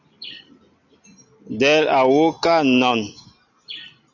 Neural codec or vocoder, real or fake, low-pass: none; real; 7.2 kHz